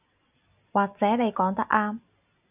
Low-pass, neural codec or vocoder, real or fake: 3.6 kHz; none; real